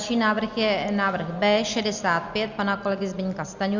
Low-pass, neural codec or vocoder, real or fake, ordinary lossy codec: 7.2 kHz; none; real; Opus, 64 kbps